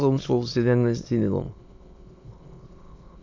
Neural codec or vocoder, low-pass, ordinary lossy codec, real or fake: autoencoder, 22.05 kHz, a latent of 192 numbers a frame, VITS, trained on many speakers; 7.2 kHz; AAC, 48 kbps; fake